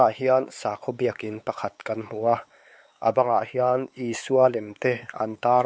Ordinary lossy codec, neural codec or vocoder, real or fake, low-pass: none; codec, 16 kHz, 4 kbps, X-Codec, WavLM features, trained on Multilingual LibriSpeech; fake; none